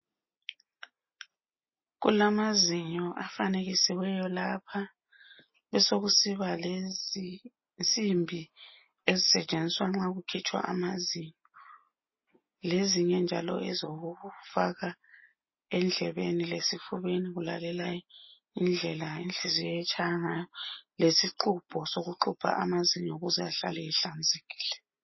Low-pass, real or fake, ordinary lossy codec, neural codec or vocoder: 7.2 kHz; real; MP3, 24 kbps; none